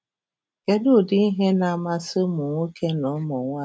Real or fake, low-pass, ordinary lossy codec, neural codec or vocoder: real; none; none; none